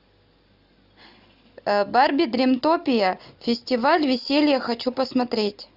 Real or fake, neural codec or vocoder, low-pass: real; none; 5.4 kHz